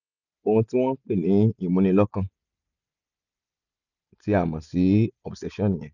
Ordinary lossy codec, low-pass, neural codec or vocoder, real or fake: none; 7.2 kHz; none; real